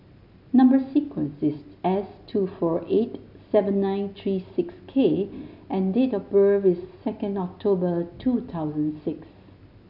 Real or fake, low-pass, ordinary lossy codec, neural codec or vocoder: real; 5.4 kHz; none; none